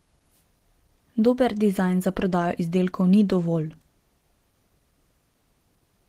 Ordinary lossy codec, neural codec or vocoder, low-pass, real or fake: Opus, 16 kbps; none; 14.4 kHz; real